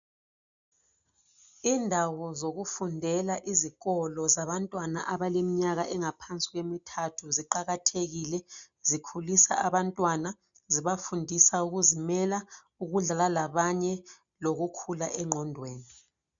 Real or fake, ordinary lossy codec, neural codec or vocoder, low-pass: real; MP3, 96 kbps; none; 7.2 kHz